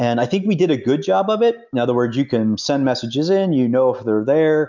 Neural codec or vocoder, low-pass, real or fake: none; 7.2 kHz; real